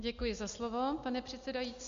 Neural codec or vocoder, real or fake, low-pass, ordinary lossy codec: none; real; 7.2 kHz; MP3, 48 kbps